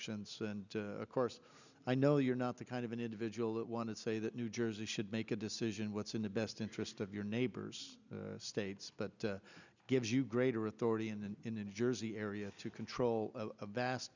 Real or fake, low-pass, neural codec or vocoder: real; 7.2 kHz; none